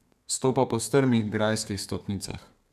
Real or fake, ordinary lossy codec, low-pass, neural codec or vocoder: fake; none; 14.4 kHz; codec, 32 kHz, 1.9 kbps, SNAC